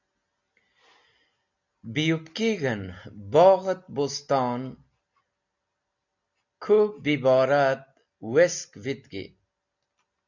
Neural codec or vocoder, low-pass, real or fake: none; 7.2 kHz; real